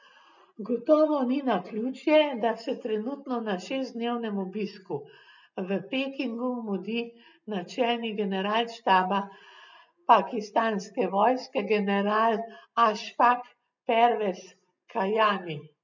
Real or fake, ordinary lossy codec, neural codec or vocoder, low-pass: real; none; none; 7.2 kHz